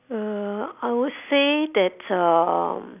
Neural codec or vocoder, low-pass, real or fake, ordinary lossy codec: none; 3.6 kHz; real; none